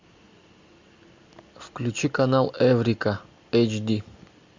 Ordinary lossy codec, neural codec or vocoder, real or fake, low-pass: MP3, 64 kbps; none; real; 7.2 kHz